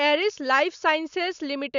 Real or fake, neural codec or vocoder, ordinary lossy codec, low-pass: real; none; none; 7.2 kHz